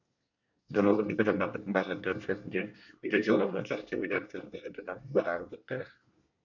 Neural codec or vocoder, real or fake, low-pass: codec, 24 kHz, 1 kbps, SNAC; fake; 7.2 kHz